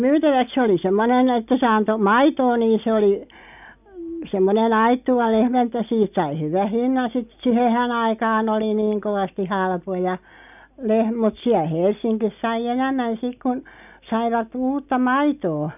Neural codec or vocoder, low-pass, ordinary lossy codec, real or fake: none; 3.6 kHz; none; real